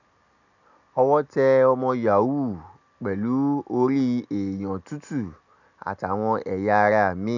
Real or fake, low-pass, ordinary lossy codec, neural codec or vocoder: real; 7.2 kHz; none; none